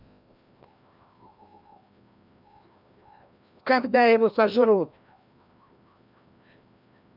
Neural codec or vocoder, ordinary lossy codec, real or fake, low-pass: codec, 16 kHz, 1 kbps, FreqCodec, larger model; none; fake; 5.4 kHz